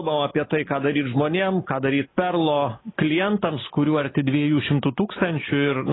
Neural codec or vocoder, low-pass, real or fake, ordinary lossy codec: none; 7.2 kHz; real; AAC, 16 kbps